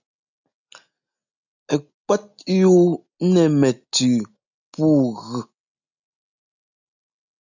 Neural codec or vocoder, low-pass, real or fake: none; 7.2 kHz; real